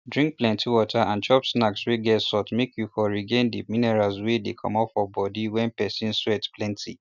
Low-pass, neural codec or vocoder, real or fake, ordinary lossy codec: 7.2 kHz; none; real; none